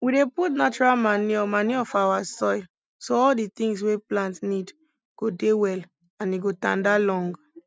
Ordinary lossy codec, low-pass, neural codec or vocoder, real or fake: none; none; none; real